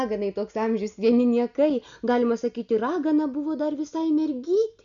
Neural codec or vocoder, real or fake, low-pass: none; real; 7.2 kHz